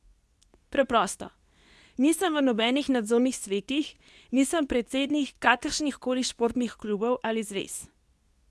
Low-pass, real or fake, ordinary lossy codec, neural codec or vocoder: none; fake; none; codec, 24 kHz, 0.9 kbps, WavTokenizer, medium speech release version 2